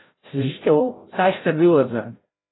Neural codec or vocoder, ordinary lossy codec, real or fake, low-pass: codec, 16 kHz, 0.5 kbps, FreqCodec, larger model; AAC, 16 kbps; fake; 7.2 kHz